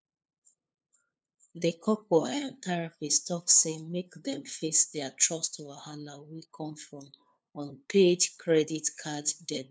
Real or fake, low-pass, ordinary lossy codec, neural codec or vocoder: fake; none; none; codec, 16 kHz, 2 kbps, FunCodec, trained on LibriTTS, 25 frames a second